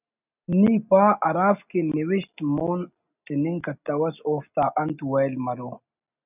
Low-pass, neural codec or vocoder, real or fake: 3.6 kHz; none; real